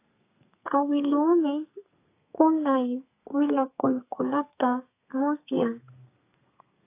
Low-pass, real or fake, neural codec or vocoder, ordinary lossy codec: 3.6 kHz; fake; codec, 32 kHz, 1.9 kbps, SNAC; AAC, 24 kbps